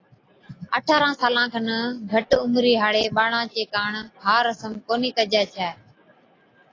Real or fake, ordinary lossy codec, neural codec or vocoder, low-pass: real; AAC, 32 kbps; none; 7.2 kHz